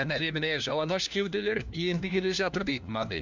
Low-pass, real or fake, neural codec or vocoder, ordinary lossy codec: 7.2 kHz; fake; codec, 16 kHz, 1 kbps, FunCodec, trained on LibriTTS, 50 frames a second; none